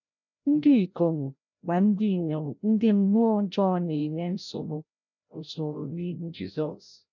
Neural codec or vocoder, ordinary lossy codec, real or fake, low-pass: codec, 16 kHz, 0.5 kbps, FreqCodec, larger model; none; fake; 7.2 kHz